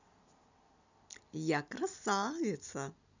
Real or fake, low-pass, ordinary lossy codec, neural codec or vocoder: real; 7.2 kHz; none; none